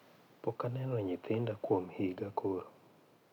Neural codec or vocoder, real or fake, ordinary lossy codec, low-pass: none; real; none; 19.8 kHz